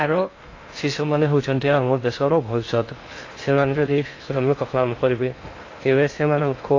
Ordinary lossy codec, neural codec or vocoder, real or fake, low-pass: AAC, 32 kbps; codec, 16 kHz in and 24 kHz out, 0.6 kbps, FocalCodec, streaming, 2048 codes; fake; 7.2 kHz